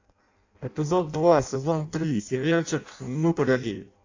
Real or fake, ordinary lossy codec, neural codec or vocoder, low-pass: fake; AAC, 48 kbps; codec, 16 kHz in and 24 kHz out, 0.6 kbps, FireRedTTS-2 codec; 7.2 kHz